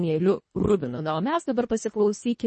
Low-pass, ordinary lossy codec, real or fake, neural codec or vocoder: 10.8 kHz; MP3, 32 kbps; fake; codec, 24 kHz, 1.5 kbps, HILCodec